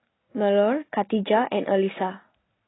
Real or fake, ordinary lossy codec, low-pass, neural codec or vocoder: real; AAC, 16 kbps; 7.2 kHz; none